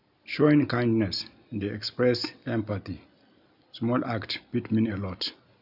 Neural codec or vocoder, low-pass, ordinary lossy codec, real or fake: none; 5.4 kHz; none; real